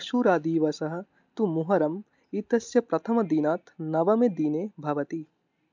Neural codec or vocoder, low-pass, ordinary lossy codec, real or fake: none; 7.2 kHz; MP3, 64 kbps; real